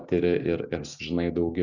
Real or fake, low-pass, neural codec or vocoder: real; 7.2 kHz; none